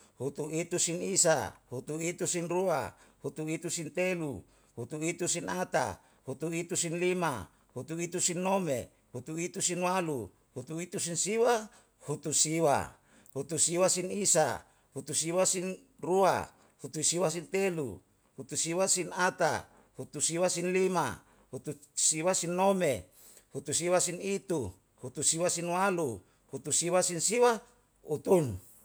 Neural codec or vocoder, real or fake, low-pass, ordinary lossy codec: none; real; none; none